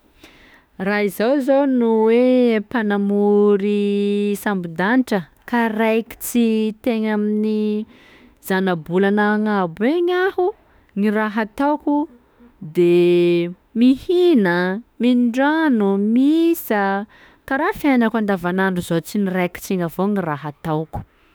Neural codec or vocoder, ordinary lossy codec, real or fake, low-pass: autoencoder, 48 kHz, 32 numbers a frame, DAC-VAE, trained on Japanese speech; none; fake; none